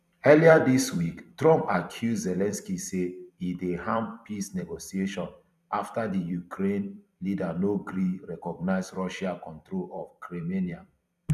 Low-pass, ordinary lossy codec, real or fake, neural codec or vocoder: 14.4 kHz; none; fake; vocoder, 44.1 kHz, 128 mel bands every 256 samples, BigVGAN v2